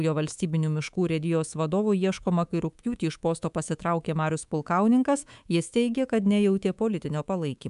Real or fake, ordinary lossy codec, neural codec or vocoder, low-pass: fake; MP3, 96 kbps; codec, 24 kHz, 3.1 kbps, DualCodec; 10.8 kHz